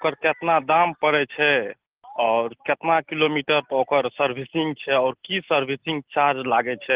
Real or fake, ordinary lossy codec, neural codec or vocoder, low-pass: real; Opus, 16 kbps; none; 3.6 kHz